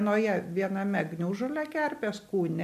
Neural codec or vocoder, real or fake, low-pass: none; real; 14.4 kHz